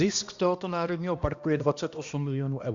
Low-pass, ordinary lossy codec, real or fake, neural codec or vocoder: 7.2 kHz; Opus, 64 kbps; fake; codec, 16 kHz, 1 kbps, X-Codec, HuBERT features, trained on balanced general audio